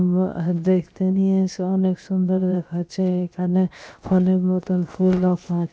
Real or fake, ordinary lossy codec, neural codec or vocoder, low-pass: fake; none; codec, 16 kHz, 0.7 kbps, FocalCodec; none